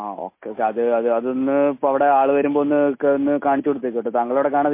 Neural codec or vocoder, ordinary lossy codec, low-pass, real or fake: none; MP3, 24 kbps; 3.6 kHz; real